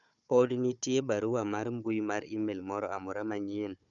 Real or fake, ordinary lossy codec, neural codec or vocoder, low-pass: fake; none; codec, 16 kHz, 4 kbps, FunCodec, trained on Chinese and English, 50 frames a second; 7.2 kHz